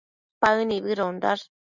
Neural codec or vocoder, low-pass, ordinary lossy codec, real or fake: none; 7.2 kHz; Opus, 64 kbps; real